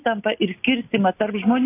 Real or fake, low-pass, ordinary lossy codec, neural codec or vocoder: real; 3.6 kHz; AAC, 16 kbps; none